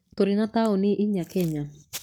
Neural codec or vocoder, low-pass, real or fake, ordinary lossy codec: codec, 44.1 kHz, 7.8 kbps, Pupu-Codec; none; fake; none